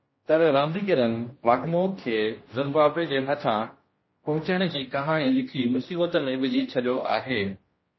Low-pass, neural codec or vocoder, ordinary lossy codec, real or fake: 7.2 kHz; codec, 16 kHz, 1 kbps, X-Codec, HuBERT features, trained on general audio; MP3, 24 kbps; fake